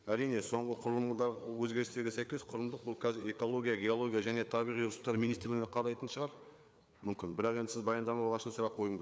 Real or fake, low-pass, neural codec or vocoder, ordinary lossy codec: fake; none; codec, 16 kHz, 4 kbps, FreqCodec, larger model; none